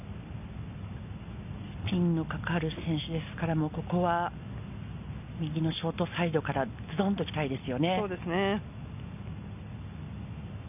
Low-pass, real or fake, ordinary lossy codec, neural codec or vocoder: 3.6 kHz; real; none; none